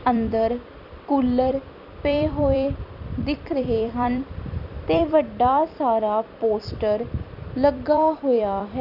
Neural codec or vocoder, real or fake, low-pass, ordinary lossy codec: vocoder, 44.1 kHz, 128 mel bands every 256 samples, BigVGAN v2; fake; 5.4 kHz; none